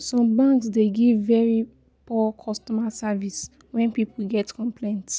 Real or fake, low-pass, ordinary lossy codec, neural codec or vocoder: real; none; none; none